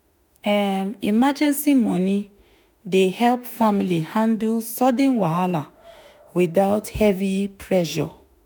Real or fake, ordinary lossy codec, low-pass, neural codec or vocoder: fake; none; none; autoencoder, 48 kHz, 32 numbers a frame, DAC-VAE, trained on Japanese speech